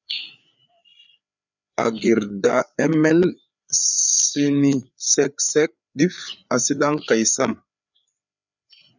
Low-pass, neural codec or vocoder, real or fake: 7.2 kHz; codec, 16 kHz, 4 kbps, FreqCodec, larger model; fake